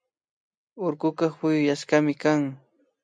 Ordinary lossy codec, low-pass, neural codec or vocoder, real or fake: MP3, 64 kbps; 9.9 kHz; none; real